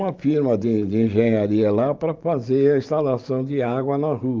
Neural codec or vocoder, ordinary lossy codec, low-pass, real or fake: vocoder, 44.1 kHz, 128 mel bands every 512 samples, BigVGAN v2; Opus, 32 kbps; 7.2 kHz; fake